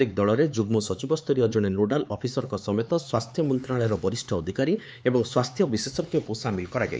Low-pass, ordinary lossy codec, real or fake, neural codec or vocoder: none; none; fake; codec, 16 kHz, 4 kbps, X-Codec, HuBERT features, trained on LibriSpeech